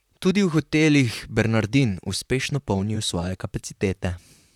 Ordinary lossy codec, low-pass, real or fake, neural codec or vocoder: none; 19.8 kHz; fake; vocoder, 44.1 kHz, 128 mel bands, Pupu-Vocoder